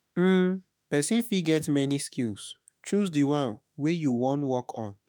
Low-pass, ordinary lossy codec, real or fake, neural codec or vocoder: none; none; fake; autoencoder, 48 kHz, 32 numbers a frame, DAC-VAE, trained on Japanese speech